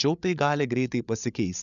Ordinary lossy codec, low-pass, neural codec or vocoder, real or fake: MP3, 96 kbps; 7.2 kHz; codec, 16 kHz, 8 kbps, FreqCodec, larger model; fake